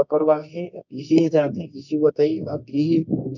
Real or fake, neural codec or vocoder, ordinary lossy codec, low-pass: fake; codec, 24 kHz, 0.9 kbps, WavTokenizer, medium music audio release; none; 7.2 kHz